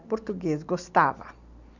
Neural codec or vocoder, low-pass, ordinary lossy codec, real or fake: none; 7.2 kHz; none; real